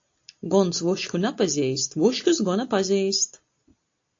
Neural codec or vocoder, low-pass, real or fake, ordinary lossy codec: none; 7.2 kHz; real; AAC, 48 kbps